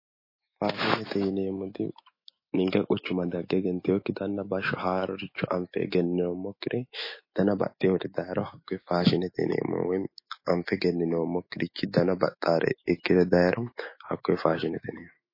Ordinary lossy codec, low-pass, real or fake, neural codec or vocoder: MP3, 24 kbps; 5.4 kHz; fake; autoencoder, 48 kHz, 128 numbers a frame, DAC-VAE, trained on Japanese speech